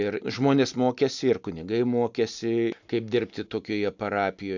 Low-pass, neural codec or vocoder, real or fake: 7.2 kHz; none; real